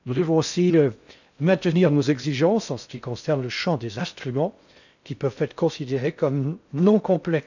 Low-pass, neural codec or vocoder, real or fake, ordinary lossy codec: 7.2 kHz; codec, 16 kHz in and 24 kHz out, 0.6 kbps, FocalCodec, streaming, 4096 codes; fake; none